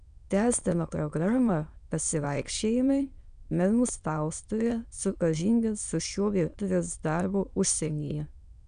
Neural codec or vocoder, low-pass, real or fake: autoencoder, 22.05 kHz, a latent of 192 numbers a frame, VITS, trained on many speakers; 9.9 kHz; fake